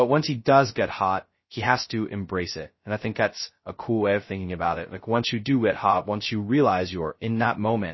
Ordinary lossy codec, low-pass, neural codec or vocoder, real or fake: MP3, 24 kbps; 7.2 kHz; codec, 16 kHz, 0.2 kbps, FocalCodec; fake